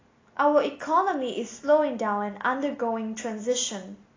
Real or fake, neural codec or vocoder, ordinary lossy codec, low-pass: real; none; AAC, 32 kbps; 7.2 kHz